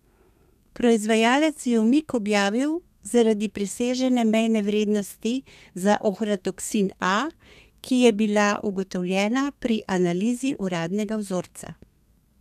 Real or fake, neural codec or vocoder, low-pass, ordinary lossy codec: fake; codec, 32 kHz, 1.9 kbps, SNAC; 14.4 kHz; none